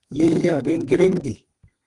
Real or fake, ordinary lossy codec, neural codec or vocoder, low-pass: fake; Opus, 24 kbps; codec, 32 kHz, 1.9 kbps, SNAC; 10.8 kHz